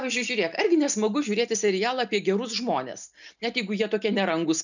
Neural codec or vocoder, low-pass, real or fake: none; 7.2 kHz; real